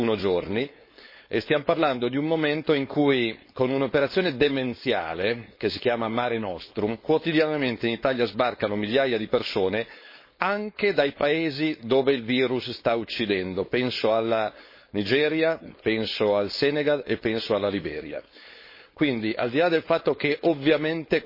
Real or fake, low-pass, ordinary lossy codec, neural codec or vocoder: fake; 5.4 kHz; MP3, 24 kbps; codec, 16 kHz, 4.8 kbps, FACodec